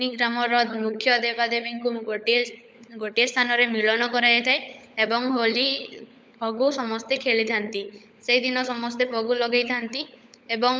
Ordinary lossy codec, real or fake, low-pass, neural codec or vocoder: none; fake; none; codec, 16 kHz, 16 kbps, FunCodec, trained on LibriTTS, 50 frames a second